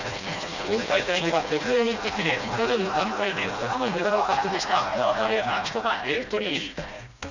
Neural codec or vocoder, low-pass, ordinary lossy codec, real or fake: codec, 16 kHz, 1 kbps, FreqCodec, smaller model; 7.2 kHz; none; fake